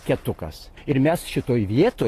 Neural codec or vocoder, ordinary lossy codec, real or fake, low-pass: none; AAC, 48 kbps; real; 14.4 kHz